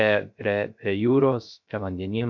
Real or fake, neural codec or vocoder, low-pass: fake; codec, 16 kHz, 0.3 kbps, FocalCodec; 7.2 kHz